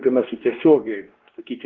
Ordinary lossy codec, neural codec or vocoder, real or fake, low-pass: Opus, 16 kbps; codec, 16 kHz, 1 kbps, X-Codec, WavLM features, trained on Multilingual LibriSpeech; fake; 7.2 kHz